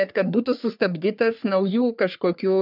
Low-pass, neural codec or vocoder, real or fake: 5.4 kHz; codec, 44.1 kHz, 3.4 kbps, Pupu-Codec; fake